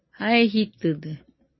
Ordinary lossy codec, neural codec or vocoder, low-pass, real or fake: MP3, 24 kbps; none; 7.2 kHz; real